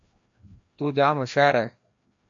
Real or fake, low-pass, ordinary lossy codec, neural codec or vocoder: fake; 7.2 kHz; MP3, 48 kbps; codec, 16 kHz, 1 kbps, FreqCodec, larger model